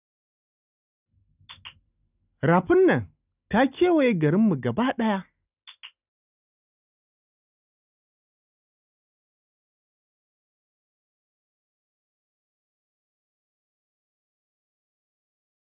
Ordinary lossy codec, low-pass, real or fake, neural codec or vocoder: none; 3.6 kHz; real; none